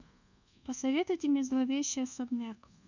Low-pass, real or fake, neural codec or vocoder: 7.2 kHz; fake; codec, 24 kHz, 1.2 kbps, DualCodec